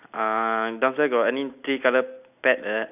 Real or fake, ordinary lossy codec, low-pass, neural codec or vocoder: real; none; 3.6 kHz; none